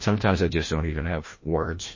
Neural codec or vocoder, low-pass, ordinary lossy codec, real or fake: codec, 16 kHz, 1 kbps, FreqCodec, larger model; 7.2 kHz; MP3, 32 kbps; fake